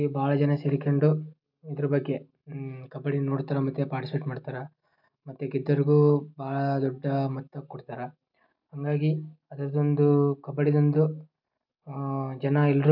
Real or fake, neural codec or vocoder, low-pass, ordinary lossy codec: real; none; 5.4 kHz; none